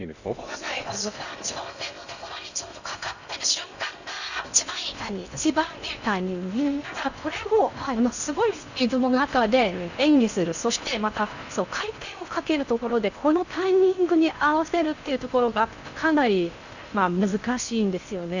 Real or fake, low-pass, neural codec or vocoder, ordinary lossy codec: fake; 7.2 kHz; codec, 16 kHz in and 24 kHz out, 0.6 kbps, FocalCodec, streaming, 2048 codes; none